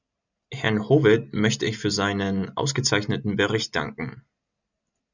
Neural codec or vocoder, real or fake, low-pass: none; real; 7.2 kHz